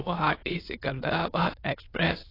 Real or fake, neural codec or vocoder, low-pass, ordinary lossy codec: fake; autoencoder, 22.05 kHz, a latent of 192 numbers a frame, VITS, trained on many speakers; 5.4 kHz; AAC, 24 kbps